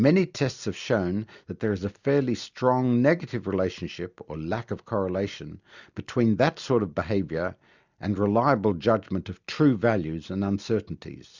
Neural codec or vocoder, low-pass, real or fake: none; 7.2 kHz; real